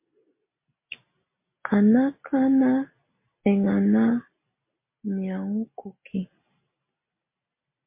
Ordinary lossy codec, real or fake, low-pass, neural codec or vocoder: MP3, 24 kbps; real; 3.6 kHz; none